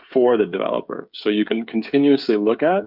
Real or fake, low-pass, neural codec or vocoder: fake; 5.4 kHz; codec, 16 kHz, 16 kbps, FreqCodec, smaller model